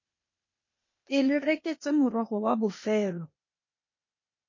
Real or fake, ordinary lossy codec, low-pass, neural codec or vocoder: fake; MP3, 32 kbps; 7.2 kHz; codec, 16 kHz, 0.8 kbps, ZipCodec